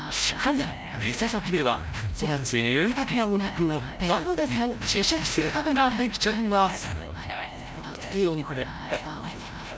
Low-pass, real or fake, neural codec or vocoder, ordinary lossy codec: none; fake; codec, 16 kHz, 0.5 kbps, FreqCodec, larger model; none